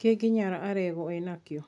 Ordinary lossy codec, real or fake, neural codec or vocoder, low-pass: none; real; none; 10.8 kHz